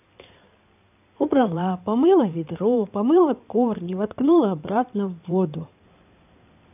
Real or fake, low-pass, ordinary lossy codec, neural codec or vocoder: fake; 3.6 kHz; none; codec, 16 kHz in and 24 kHz out, 2.2 kbps, FireRedTTS-2 codec